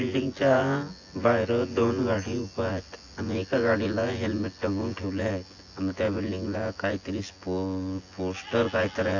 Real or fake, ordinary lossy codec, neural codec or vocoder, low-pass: fake; AAC, 48 kbps; vocoder, 24 kHz, 100 mel bands, Vocos; 7.2 kHz